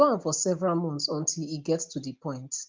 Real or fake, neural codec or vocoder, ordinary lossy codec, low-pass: real; none; Opus, 32 kbps; 7.2 kHz